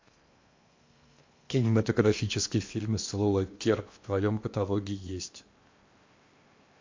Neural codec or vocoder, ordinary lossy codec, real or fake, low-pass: codec, 16 kHz in and 24 kHz out, 0.8 kbps, FocalCodec, streaming, 65536 codes; MP3, 48 kbps; fake; 7.2 kHz